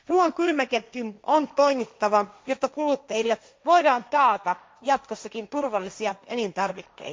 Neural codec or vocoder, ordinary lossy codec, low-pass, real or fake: codec, 16 kHz, 1.1 kbps, Voila-Tokenizer; none; none; fake